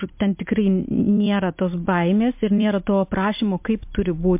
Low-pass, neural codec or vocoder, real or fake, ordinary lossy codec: 3.6 kHz; vocoder, 44.1 kHz, 128 mel bands every 256 samples, BigVGAN v2; fake; MP3, 32 kbps